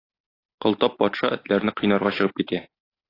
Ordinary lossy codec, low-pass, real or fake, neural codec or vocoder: AAC, 24 kbps; 5.4 kHz; real; none